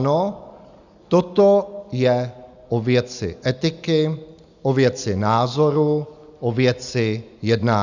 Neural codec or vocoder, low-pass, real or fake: none; 7.2 kHz; real